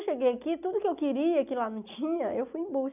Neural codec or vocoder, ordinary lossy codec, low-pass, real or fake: none; none; 3.6 kHz; real